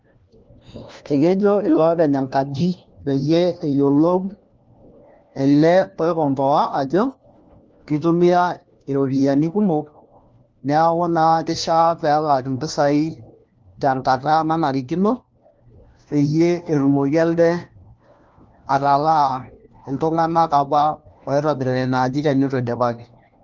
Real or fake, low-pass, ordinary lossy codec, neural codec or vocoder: fake; 7.2 kHz; Opus, 32 kbps; codec, 16 kHz, 1 kbps, FunCodec, trained on LibriTTS, 50 frames a second